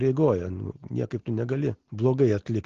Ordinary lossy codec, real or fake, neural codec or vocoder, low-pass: Opus, 16 kbps; real; none; 7.2 kHz